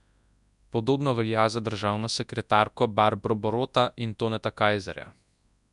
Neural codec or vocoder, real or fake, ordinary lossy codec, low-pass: codec, 24 kHz, 0.9 kbps, WavTokenizer, large speech release; fake; none; 10.8 kHz